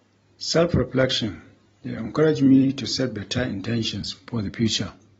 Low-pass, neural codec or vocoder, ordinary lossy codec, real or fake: 19.8 kHz; vocoder, 44.1 kHz, 128 mel bands every 256 samples, BigVGAN v2; AAC, 24 kbps; fake